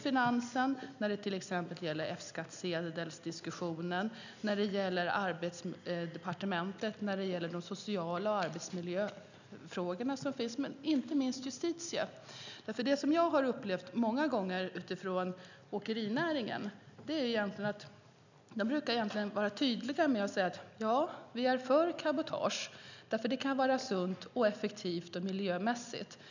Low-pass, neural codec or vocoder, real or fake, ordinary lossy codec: 7.2 kHz; none; real; none